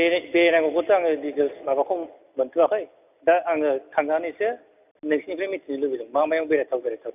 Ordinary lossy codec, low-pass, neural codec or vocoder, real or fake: none; 3.6 kHz; none; real